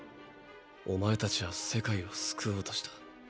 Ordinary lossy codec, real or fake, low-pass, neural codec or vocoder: none; real; none; none